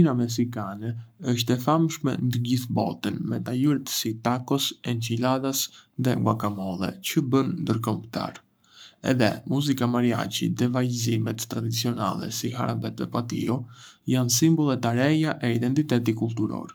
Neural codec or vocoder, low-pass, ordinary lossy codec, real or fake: codec, 44.1 kHz, 7.8 kbps, Pupu-Codec; none; none; fake